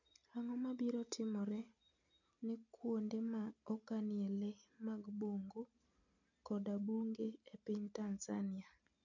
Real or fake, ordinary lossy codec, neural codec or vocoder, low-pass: real; none; none; 7.2 kHz